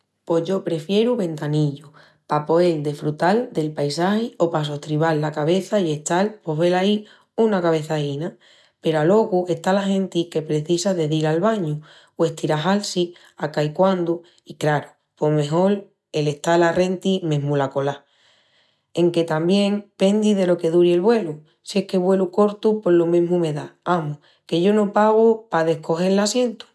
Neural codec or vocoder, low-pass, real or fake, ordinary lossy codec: none; none; real; none